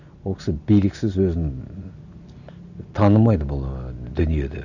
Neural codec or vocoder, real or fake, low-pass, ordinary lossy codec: none; real; 7.2 kHz; none